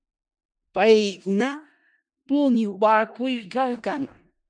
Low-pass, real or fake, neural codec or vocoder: 9.9 kHz; fake; codec, 16 kHz in and 24 kHz out, 0.4 kbps, LongCat-Audio-Codec, four codebook decoder